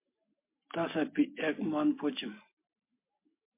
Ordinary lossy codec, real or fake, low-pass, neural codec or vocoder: MP3, 24 kbps; real; 3.6 kHz; none